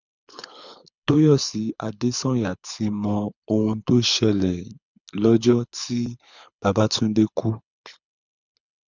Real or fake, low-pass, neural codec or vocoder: fake; 7.2 kHz; codec, 24 kHz, 6 kbps, HILCodec